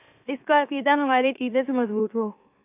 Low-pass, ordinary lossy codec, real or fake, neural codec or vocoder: 3.6 kHz; AAC, 24 kbps; fake; autoencoder, 44.1 kHz, a latent of 192 numbers a frame, MeloTTS